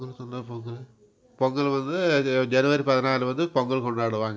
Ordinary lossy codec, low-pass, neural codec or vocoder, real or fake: none; none; none; real